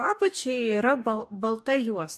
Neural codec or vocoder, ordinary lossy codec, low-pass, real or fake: codec, 44.1 kHz, 2.6 kbps, DAC; AAC, 96 kbps; 14.4 kHz; fake